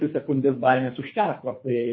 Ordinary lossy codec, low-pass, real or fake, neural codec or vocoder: MP3, 24 kbps; 7.2 kHz; fake; codec, 24 kHz, 3 kbps, HILCodec